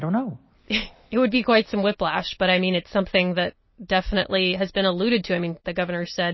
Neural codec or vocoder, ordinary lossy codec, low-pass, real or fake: none; MP3, 24 kbps; 7.2 kHz; real